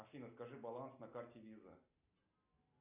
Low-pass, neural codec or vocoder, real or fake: 3.6 kHz; none; real